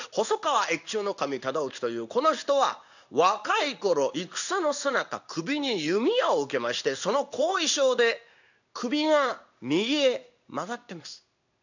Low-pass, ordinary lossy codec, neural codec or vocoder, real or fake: 7.2 kHz; none; codec, 16 kHz in and 24 kHz out, 1 kbps, XY-Tokenizer; fake